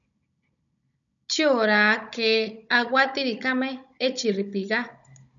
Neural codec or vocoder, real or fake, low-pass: codec, 16 kHz, 16 kbps, FunCodec, trained on Chinese and English, 50 frames a second; fake; 7.2 kHz